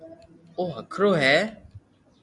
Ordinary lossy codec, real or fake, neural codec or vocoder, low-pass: AAC, 64 kbps; real; none; 10.8 kHz